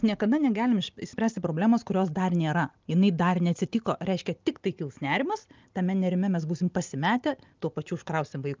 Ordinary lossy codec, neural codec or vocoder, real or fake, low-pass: Opus, 24 kbps; none; real; 7.2 kHz